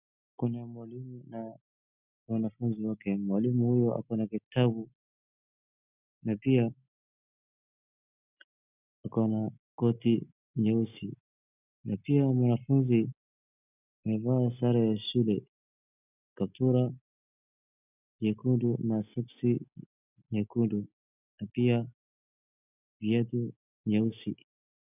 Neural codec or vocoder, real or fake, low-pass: none; real; 3.6 kHz